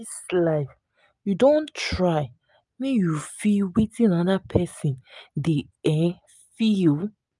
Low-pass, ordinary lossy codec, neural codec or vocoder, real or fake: 10.8 kHz; none; none; real